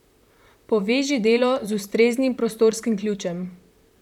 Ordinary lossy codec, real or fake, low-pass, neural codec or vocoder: none; fake; 19.8 kHz; vocoder, 44.1 kHz, 128 mel bands, Pupu-Vocoder